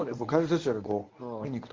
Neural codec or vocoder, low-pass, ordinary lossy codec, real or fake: codec, 24 kHz, 0.9 kbps, WavTokenizer, medium speech release version 1; 7.2 kHz; Opus, 32 kbps; fake